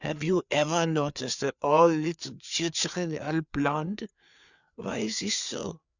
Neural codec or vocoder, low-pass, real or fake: codec, 16 kHz, 4 kbps, FreqCodec, larger model; 7.2 kHz; fake